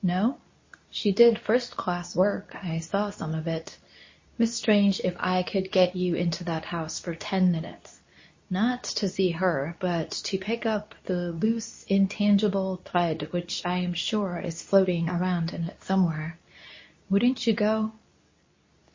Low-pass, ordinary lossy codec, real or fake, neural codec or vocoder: 7.2 kHz; MP3, 32 kbps; fake; codec, 24 kHz, 0.9 kbps, WavTokenizer, medium speech release version 2